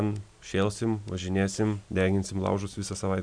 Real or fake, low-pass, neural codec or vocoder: real; 9.9 kHz; none